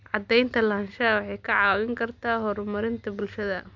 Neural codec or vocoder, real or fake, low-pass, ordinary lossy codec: none; real; 7.2 kHz; MP3, 64 kbps